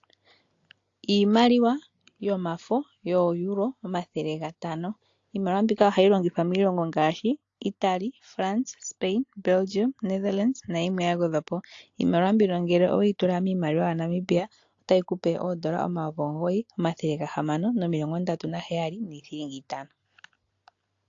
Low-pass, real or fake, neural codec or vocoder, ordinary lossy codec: 7.2 kHz; real; none; AAC, 48 kbps